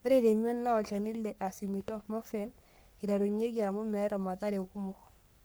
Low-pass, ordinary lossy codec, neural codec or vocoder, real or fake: none; none; codec, 44.1 kHz, 3.4 kbps, Pupu-Codec; fake